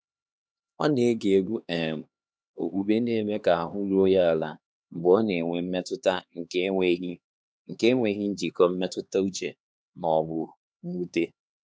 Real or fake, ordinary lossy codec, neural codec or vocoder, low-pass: fake; none; codec, 16 kHz, 2 kbps, X-Codec, HuBERT features, trained on LibriSpeech; none